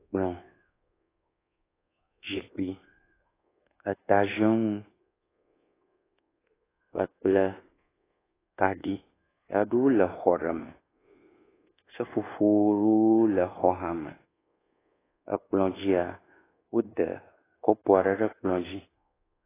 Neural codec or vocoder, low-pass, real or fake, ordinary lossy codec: codec, 24 kHz, 1.2 kbps, DualCodec; 3.6 kHz; fake; AAC, 16 kbps